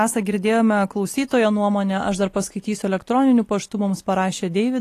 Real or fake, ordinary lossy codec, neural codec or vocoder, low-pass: real; AAC, 48 kbps; none; 14.4 kHz